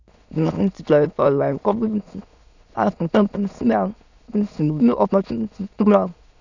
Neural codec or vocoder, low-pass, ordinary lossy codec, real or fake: autoencoder, 22.05 kHz, a latent of 192 numbers a frame, VITS, trained on many speakers; 7.2 kHz; none; fake